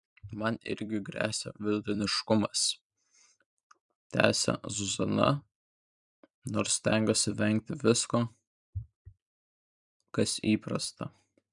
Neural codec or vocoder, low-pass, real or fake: vocoder, 44.1 kHz, 128 mel bands every 256 samples, BigVGAN v2; 10.8 kHz; fake